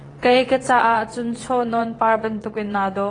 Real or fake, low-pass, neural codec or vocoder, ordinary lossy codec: fake; 9.9 kHz; vocoder, 22.05 kHz, 80 mel bands, WaveNeXt; AAC, 32 kbps